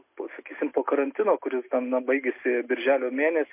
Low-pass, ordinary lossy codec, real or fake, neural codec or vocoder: 3.6 kHz; MP3, 24 kbps; real; none